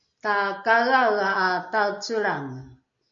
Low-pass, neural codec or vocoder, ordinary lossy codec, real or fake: 7.2 kHz; none; MP3, 48 kbps; real